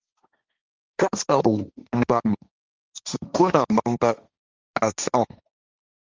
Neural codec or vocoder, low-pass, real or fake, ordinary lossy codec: codec, 16 kHz, 1.1 kbps, Voila-Tokenizer; 7.2 kHz; fake; Opus, 32 kbps